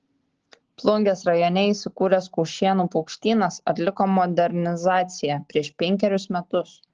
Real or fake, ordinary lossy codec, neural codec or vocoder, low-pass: real; Opus, 16 kbps; none; 7.2 kHz